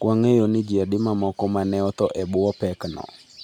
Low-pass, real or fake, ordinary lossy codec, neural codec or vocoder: 19.8 kHz; real; none; none